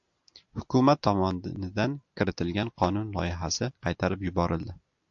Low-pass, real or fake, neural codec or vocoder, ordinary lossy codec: 7.2 kHz; real; none; AAC, 64 kbps